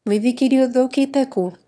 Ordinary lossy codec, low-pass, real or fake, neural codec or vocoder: none; none; fake; autoencoder, 22.05 kHz, a latent of 192 numbers a frame, VITS, trained on one speaker